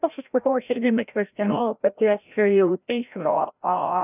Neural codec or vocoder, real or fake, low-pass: codec, 16 kHz, 0.5 kbps, FreqCodec, larger model; fake; 3.6 kHz